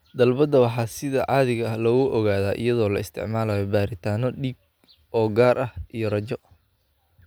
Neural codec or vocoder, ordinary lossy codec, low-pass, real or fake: none; none; none; real